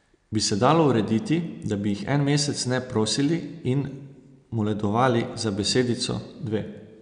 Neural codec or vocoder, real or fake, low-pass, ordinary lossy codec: none; real; 9.9 kHz; none